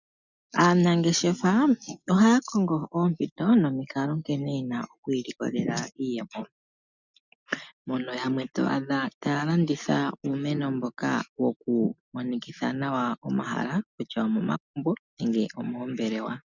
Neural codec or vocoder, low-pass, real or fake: none; 7.2 kHz; real